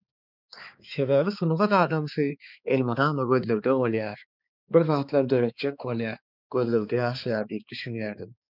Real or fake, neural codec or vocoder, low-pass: fake; codec, 16 kHz, 4 kbps, X-Codec, HuBERT features, trained on balanced general audio; 5.4 kHz